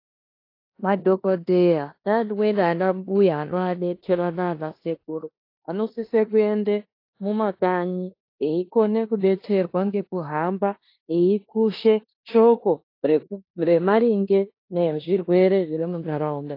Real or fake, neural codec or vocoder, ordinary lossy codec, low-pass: fake; codec, 16 kHz in and 24 kHz out, 0.9 kbps, LongCat-Audio-Codec, four codebook decoder; AAC, 32 kbps; 5.4 kHz